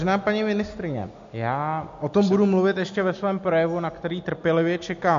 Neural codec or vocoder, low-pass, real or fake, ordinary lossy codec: none; 7.2 kHz; real; MP3, 48 kbps